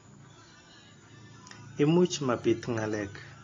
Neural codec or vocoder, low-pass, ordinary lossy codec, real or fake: none; 7.2 kHz; AAC, 32 kbps; real